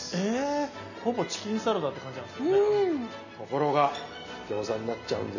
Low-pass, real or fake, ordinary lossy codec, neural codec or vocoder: 7.2 kHz; real; none; none